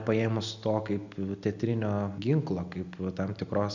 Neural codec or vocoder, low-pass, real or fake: none; 7.2 kHz; real